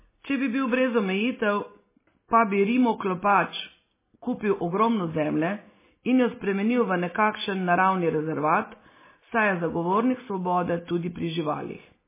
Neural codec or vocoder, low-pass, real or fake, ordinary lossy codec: none; 3.6 kHz; real; MP3, 16 kbps